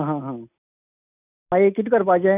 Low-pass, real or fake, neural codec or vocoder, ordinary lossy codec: 3.6 kHz; real; none; none